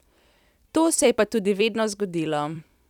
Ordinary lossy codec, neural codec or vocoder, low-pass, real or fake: none; vocoder, 44.1 kHz, 128 mel bands every 256 samples, BigVGAN v2; 19.8 kHz; fake